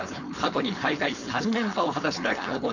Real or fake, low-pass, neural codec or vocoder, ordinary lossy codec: fake; 7.2 kHz; codec, 16 kHz, 4.8 kbps, FACodec; none